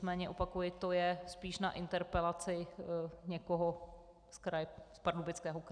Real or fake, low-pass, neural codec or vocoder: real; 9.9 kHz; none